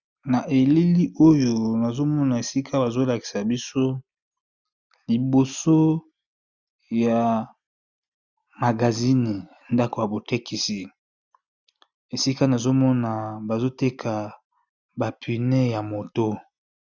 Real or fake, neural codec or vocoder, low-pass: real; none; 7.2 kHz